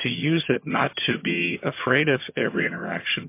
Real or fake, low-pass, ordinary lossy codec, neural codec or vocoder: fake; 3.6 kHz; MP3, 24 kbps; vocoder, 22.05 kHz, 80 mel bands, HiFi-GAN